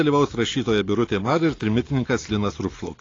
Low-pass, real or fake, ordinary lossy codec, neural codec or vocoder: 7.2 kHz; real; AAC, 32 kbps; none